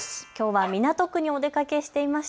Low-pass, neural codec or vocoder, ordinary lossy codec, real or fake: none; none; none; real